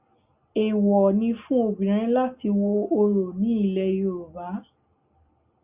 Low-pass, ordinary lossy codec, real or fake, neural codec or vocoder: 3.6 kHz; Opus, 64 kbps; real; none